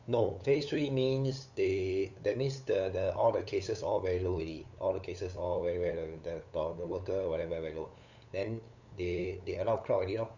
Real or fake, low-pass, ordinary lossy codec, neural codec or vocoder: fake; 7.2 kHz; none; codec, 16 kHz, 8 kbps, FunCodec, trained on LibriTTS, 25 frames a second